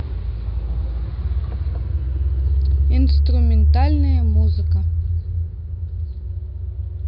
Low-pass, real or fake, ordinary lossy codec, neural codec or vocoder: 5.4 kHz; real; none; none